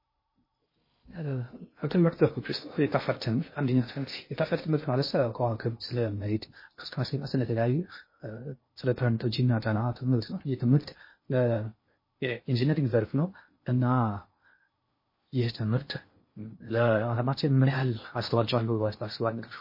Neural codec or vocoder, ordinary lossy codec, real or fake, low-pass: codec, 16 kHz in and 24 kHz out, 0.6 kbps, FocalCodec, streaming, 2048 codes; MP3, 24 kbps; fake; 5.4 kHz